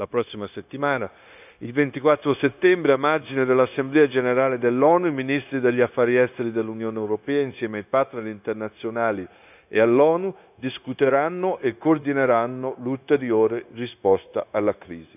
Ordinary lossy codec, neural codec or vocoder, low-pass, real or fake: none; codec, 16 kHz, 0.9 kbps, LongCat-Audio-Codec; 3.6 kHz; fake